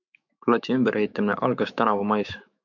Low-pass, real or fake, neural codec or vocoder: 7.2 kHz; fake; autoencoder, 48 kHz, 128 numbers a frame, DAC-VAE, trained on Japanese speech